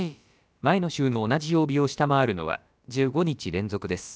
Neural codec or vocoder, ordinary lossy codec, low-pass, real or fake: codec, 16 kHz, about 1 kbps, DyCAST, with the encoder's durations; none; none; fake